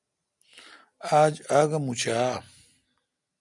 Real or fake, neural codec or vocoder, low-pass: real; none; 10.8 kHz